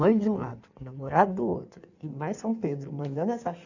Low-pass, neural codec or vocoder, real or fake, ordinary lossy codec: 7.2 kHz; codec, 16 kHz in and 24 kHz out, 1.1 kbps, FireRedTTS-2 codec; fake; none